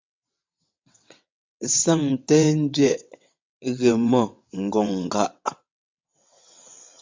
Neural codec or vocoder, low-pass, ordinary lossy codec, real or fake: vocoder, 22.05 kHz, 80 mel bands, WaveNeXt; 7.2 kHz; MP3, 64 kbps; fake